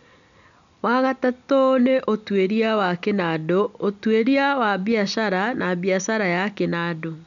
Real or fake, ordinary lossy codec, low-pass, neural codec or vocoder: real; none; 7.2 kHz; none